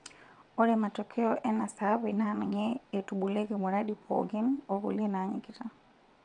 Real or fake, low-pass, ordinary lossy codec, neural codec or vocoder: fake; 9.9 kHz; none; vocoder, 22.05 kHz, 80 mel bands, WaveNeXt